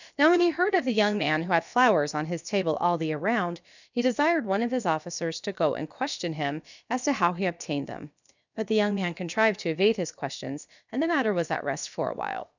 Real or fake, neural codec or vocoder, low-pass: fake; codec, 16 kHz, about 1 kbps, DyCAST, with the encoder's durations; 7.2 kHz